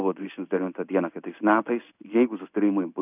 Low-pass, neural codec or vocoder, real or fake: 3.6 kHz; codec, 16 kHz in and 24 kHz out, 1 kbps, XY-Tokenizer; fake